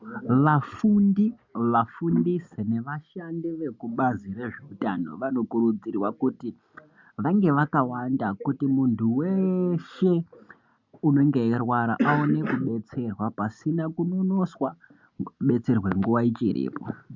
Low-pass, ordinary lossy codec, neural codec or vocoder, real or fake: 7.2 kHz; MP3, 64 kbps; none; real